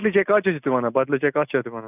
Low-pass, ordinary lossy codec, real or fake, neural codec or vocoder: 3.6 kHz; none; real; none